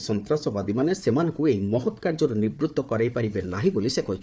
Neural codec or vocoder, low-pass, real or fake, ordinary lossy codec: codec, 16 kHz, 4 kbps, FunCodec, trained on Chinese and English, 50 frames a second; none; fake; none